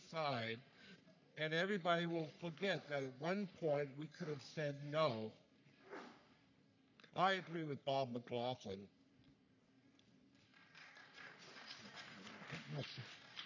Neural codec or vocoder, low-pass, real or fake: codec, 44.1 kHz, 3.4 kbps, Pupu-Codec; 7.2 kHz; fake